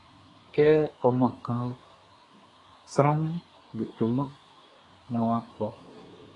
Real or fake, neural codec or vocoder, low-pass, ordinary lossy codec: fake; codec, 24 kHz, 1 kbps, SNAC; 10.8 kHz; AAC, 32 kbps